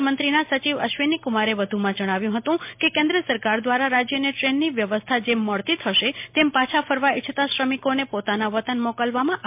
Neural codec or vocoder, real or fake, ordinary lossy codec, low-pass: none; real; MP3, 32 kbps; 3.6 kHz